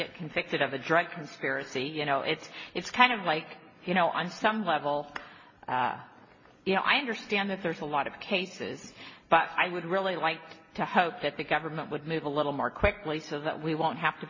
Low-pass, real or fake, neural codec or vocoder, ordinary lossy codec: 7.2 kHz; real; none; MP3, 32 kbps